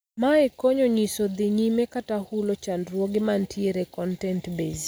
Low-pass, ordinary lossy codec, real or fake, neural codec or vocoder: none; none; real; none